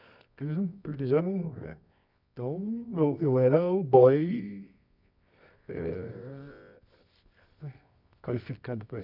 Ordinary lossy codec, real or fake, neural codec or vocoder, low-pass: none; fake; codec, 24 kHz, 0.9 kbps, WavTokenizer, medium music audio release; 5.4 kHz